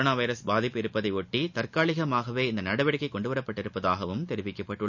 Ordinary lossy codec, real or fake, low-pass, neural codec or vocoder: none; real; 7.2 kHz; none